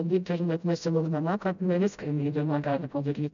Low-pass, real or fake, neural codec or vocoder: 7.2 kHz; fake; codec, 16 kHz, 0.5 kbps, FreqCodec, smaller model